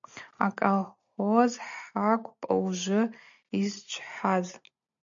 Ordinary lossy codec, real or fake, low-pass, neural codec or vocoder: MP3, 64 kbps; real; 7.2 kHz; none